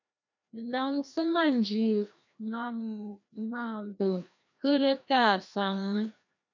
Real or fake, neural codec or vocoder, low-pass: fake; codec, 16 kHz, 1 kbps, FreqCodec, larger model; 7.2 kHz